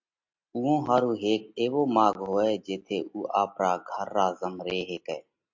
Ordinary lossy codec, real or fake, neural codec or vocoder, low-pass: MP3, 48 kbps; real; none; 7.2 kHz